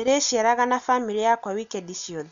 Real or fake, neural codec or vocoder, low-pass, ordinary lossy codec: real; none; 7.2 kHz; MP3, 64 kbps